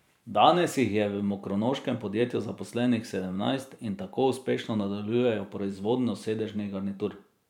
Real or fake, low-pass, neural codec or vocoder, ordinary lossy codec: fake; 19.8 kHz; vocoder, 44.1 kHz, 128 mel bands every 512 samples, BigVGAN v2; none